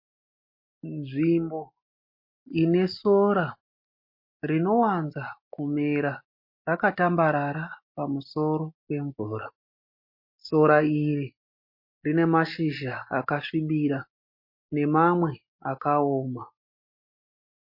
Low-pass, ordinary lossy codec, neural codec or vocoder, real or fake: 5.4 kHz; MP3, 32 kbps; none; real